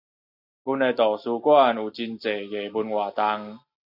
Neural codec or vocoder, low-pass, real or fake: none; 5.4 kHz; real